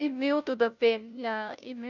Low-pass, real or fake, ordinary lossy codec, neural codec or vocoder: 7.2 kHz; fake; none; codec, 16 kHz, 0.5 kbps, FunCodec, trained on Chinese and English, 25 frames a second